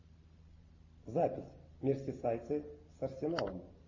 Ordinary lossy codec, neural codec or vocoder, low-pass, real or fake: MP3, 32 kbps; none; 7.2 kHz; real